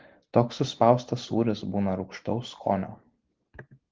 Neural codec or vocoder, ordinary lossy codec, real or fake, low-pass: none; Opus, 16 kbps; real; 7.2 kHz